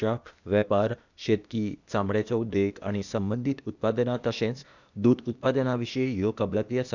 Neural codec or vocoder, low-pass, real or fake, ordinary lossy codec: codec, 16 kHz, 0.8 kbps, ZipCodec; 7.2 kHz; fake; none